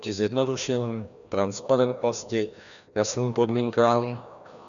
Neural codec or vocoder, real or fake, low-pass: codec, 16 kHz, 1 kbps, FreqCodec, larger model; fake; 7.2 kHz